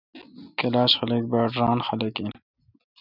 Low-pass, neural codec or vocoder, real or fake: 5.4 kHz; none; real